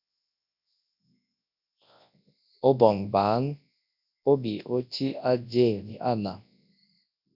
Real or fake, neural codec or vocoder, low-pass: fake; codec, 24 kHz, 0.9 kbps, WavTokenizer, large speech release; 5.4 kHz